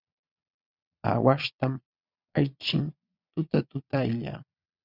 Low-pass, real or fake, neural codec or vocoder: 5.4 kHz; fake; vocoder, 22.05 kHz, 80 mel bands, Vocos